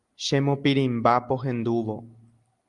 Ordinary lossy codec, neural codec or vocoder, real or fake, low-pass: Opus, 32 kbps; none; real; 10.8 kHz